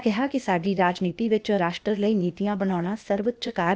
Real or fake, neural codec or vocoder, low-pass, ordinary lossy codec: fake; codec, 16 kHz, 0.8 kbps, ZipCodec; none; none